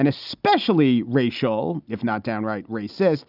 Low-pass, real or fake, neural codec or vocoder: 5.4 kHz; real; none